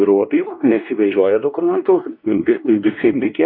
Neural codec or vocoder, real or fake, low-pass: codec, 16 kHz, 1 kbps, X-Codec, WavLM features, trained on Multilingual LibriSpeech; fake; 5.4 kHz